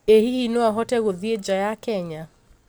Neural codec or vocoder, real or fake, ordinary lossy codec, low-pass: none; real; none; none